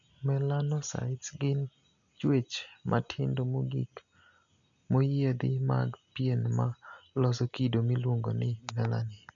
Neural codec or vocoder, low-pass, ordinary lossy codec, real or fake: none; 7.2 kHz; none; real